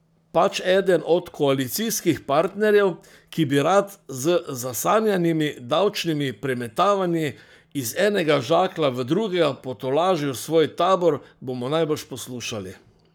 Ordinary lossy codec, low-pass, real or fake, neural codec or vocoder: none; none; fake; codec, 44.1 kHz, 7.8 kbps, Pupu-Codec